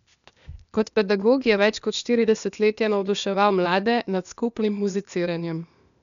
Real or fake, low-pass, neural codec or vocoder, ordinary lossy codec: fake; 7.2 kHz; codec, 16 kHz, 0.8 kbps, ZipCodec; MP3, 96 kbps